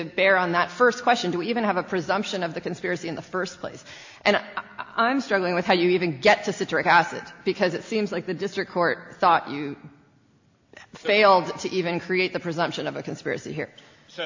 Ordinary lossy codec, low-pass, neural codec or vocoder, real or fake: AAC, 48 kbps; 7.2 kHz; none; real